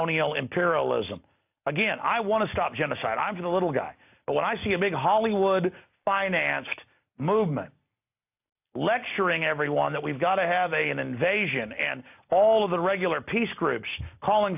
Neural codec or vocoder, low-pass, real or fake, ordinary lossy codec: none; 3.6 kHz; real; AAC, 32 kbps